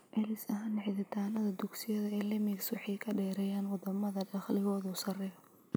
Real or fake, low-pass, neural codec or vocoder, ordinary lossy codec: real; none; none; none